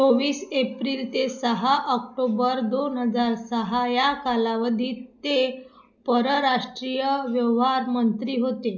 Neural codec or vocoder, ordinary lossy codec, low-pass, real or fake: none; none; 7.2 kHz; real